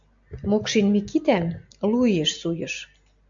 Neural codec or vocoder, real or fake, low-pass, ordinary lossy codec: none; real; 7.2 kHz; MP3, 64 kbps